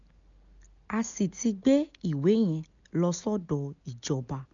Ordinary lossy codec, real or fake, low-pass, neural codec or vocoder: none; real; 7.2 kHz; none